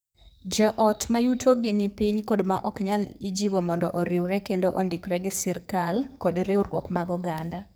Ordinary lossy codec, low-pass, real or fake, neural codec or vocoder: none; none; fake; codec, 44.1 kHz, 2.6 kbps, SNAC